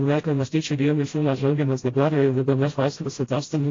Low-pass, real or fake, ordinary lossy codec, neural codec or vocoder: 7.2 kHz; fake; AAC, 32 kbps; codec, 16 kHz, 0.5 kbps, FreqCodec, smaller model